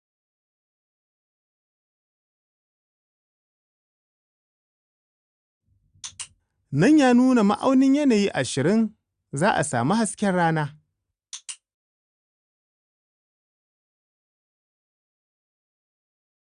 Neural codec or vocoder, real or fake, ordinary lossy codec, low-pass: none; real; Opus, 64 kbps; 9.9 kHz